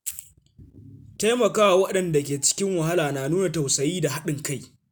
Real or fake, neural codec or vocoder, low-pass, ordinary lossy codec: real; none; none; none